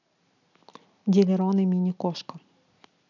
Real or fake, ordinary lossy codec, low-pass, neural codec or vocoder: real; none; 7.2 kHz; none